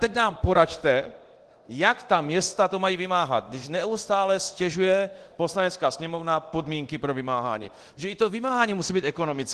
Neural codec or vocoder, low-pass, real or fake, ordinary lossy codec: codec, 24 kHz, 0.9 kbps, DualCodec; 10.8 kHz; fake; Opus, 16 kbps